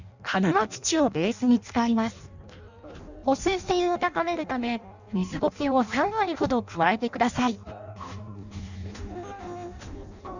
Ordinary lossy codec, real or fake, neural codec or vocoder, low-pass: none; fake; codec, 16 kHz in and 24 kHz out, 0.6 kbps, FireRedTTS-2 codec; 7.2 kHz